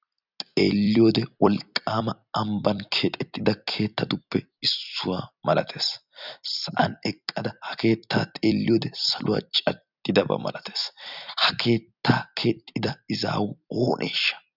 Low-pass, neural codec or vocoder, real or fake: 5.4 kHz; vocoder, 44.1 kHz, 128 mel bands every 256 samples, BigVGAN v2; fake